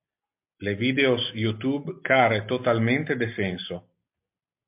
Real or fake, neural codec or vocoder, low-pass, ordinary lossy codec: real; none; 3.6 kHz; MP3, 32 kbps